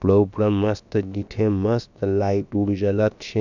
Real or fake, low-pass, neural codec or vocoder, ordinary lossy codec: fake; 7.2 kHz; codec, 16 kHz, about 1 kbps, DyCAST, with the encoder's durations; none